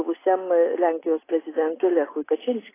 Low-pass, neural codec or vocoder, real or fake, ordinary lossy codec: 3.6 kHz; none; real; AAC, 16 kbps